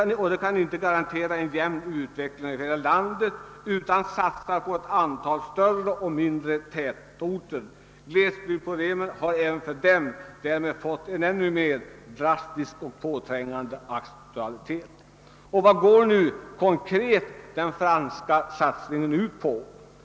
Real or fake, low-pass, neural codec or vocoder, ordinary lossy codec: real; none; none; none